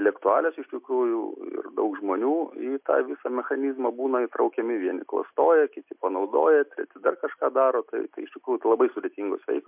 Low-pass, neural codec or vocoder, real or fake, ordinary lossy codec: 3.6 kHz; none; real; MP3, 32 kbps